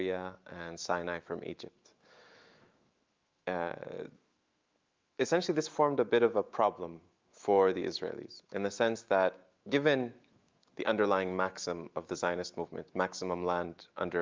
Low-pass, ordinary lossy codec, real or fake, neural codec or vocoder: 7.2 kHz; Opus, 24 kbps; fake; autoencoder, 48 kHz, 128 numbers a frame, DAC-VAE, trained on Japanese speech